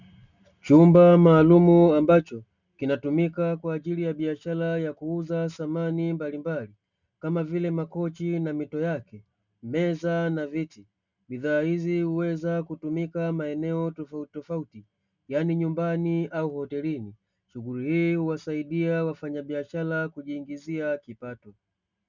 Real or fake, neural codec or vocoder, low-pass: real; none; 7.2 kHz